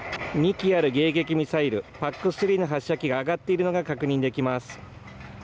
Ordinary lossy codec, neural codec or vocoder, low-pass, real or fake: none; none; none; real